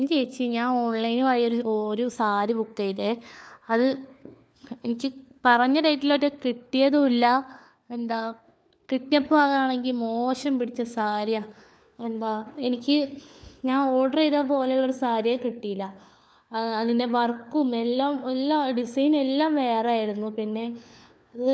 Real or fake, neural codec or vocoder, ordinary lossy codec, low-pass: fake; codec, 16 kHz, 4 kbps, FunCodec, trained on LibriTTS, 50 frames a second; none; none